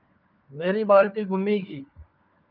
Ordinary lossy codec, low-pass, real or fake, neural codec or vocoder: Opus, 24 kbps; 5.4 kHz; fake; codec, 16 kHz, 4 kbps, FunCodec, trained on LibriTTS, 50 frames a second